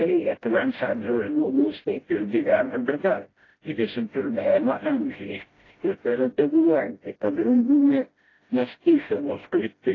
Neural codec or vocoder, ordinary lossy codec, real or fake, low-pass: codec, 16 kHz, 0.5 kbps, FreqCodec, smaller model; AAC, 32 kbps; fake; 7.2 kHz